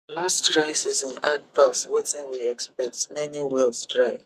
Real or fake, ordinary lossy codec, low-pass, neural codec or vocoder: fake; Opus, 64 kbps; 14.4 kHz; codec, 44.1 kHz, 2.6 kbps, SNAC